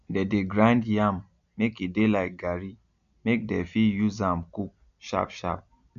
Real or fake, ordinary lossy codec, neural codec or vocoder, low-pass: real; none; none; 7.2 kHz